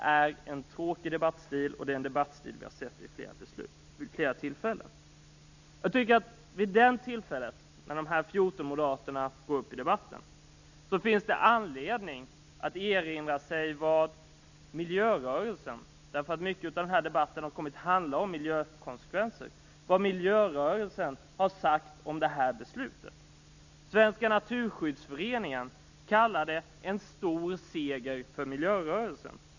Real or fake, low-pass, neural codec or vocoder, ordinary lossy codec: real; 7.2 kHz; none; none